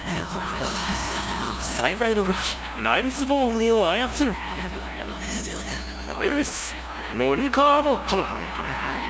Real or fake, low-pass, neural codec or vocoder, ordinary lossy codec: fake; none; codec, 16 kHz, 0.5 kbps, FunCodec, trained on LibriTTS, 25 frames a second; none